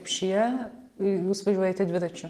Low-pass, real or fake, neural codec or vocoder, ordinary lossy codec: 14.4 kHz; real; none; Opus, 24 kbps